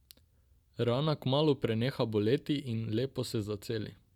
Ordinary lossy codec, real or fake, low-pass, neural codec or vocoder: none; real; 19.8 kHz; none